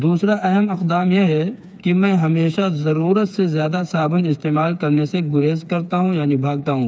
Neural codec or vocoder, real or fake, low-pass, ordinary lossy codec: codec, 16 kHz, 4 kbps, FreqCodec, smaller model; fake; none; none